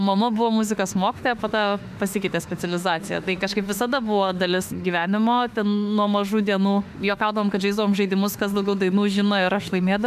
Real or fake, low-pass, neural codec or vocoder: fake; 14.4 kHz; autoencoder, 48 kHz, 32 numbers a frame, DAC-VAE, trained on Japanese speech